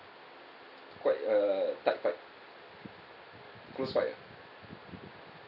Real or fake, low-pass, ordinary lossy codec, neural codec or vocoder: real; 5.4 kHz; none; none